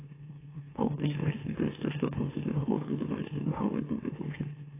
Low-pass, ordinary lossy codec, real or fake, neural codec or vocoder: 3.6 kHz; AAC, 16 kbps; fake; autoencoder, 44.1 kHz, a latent of 192 numbers a frame, MeloTTS